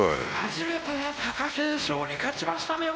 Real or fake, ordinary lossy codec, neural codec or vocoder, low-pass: fake; none; codec, 16 kHz, 1 kbps, X-Codec, WavLM features, trained on Multilingual LibriSpeech; none